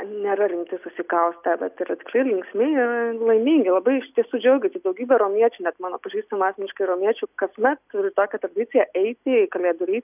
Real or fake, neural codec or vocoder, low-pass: real; none; 3.6 kHz